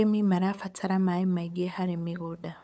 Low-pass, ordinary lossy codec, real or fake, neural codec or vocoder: none; none; fake; codec, 16 kHz, 8 kbps, FunCodec, trained on LibriTTS, 25 frames a second